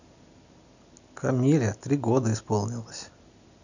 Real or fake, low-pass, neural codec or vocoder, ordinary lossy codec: real; 7.2 kHz; none; none